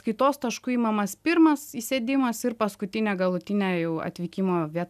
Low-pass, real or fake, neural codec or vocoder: 14.4 kHz; real; none